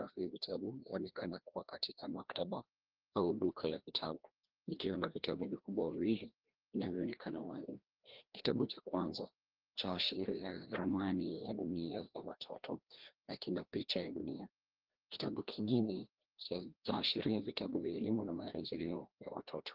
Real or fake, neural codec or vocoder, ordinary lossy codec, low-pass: fake; codec, 16 kHz, 1 kbps, FreqCodec, larger model; Opus, 16 kbps; 5.4 kHz